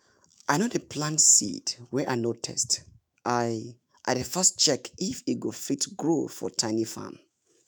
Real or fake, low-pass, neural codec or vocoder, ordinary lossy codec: fake; none; autoencoder, 48 kHz, 128 numbers a frame, DAC-VAE, trained on Japanese speech; none